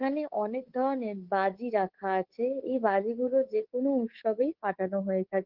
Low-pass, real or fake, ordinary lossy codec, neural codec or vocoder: 5.4 kHz; fake; Opus, 16 kbps; codec, 24 kHz, 3.1 kbps, DualCodec